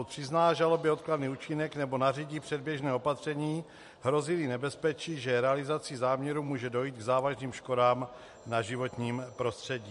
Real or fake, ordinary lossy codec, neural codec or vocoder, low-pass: real; MP3, 48 kbps; none; 14.4 kHz